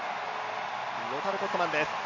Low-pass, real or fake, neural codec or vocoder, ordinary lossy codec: 7.2 kHz; real; none; none